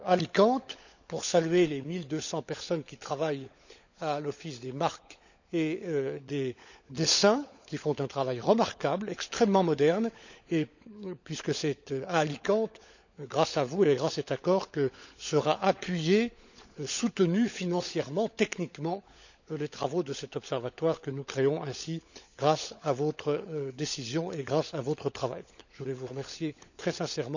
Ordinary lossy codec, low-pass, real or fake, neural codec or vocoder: none; 7.2 kHz; fake; codec, 16 kHz, 16 kbps, FunCodec, trained on LibriTTS, 50 frames a second